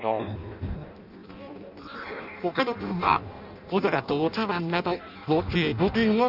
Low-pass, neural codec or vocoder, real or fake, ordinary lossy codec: 5.4 kHz; codec, 16 kHz in and 24 kHz out, 0.6 kbps, FireRedTTS-2 codec; fake; none